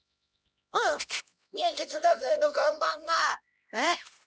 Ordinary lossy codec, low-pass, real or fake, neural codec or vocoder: none; none; fake; codec, 16 kHz, 1 kbps, X-Codec, HuBERT features, trained on LibriSpeech